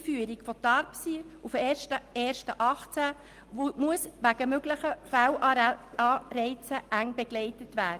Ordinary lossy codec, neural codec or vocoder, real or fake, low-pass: Opus, 24 kbps; none; real; 14.4 kHz